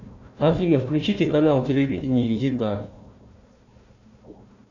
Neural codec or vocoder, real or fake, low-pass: codec, 16 kHz, 1 kbps, FunCodec, trained on Chinese and English, 50 frames a second; fake; 7.2 kHz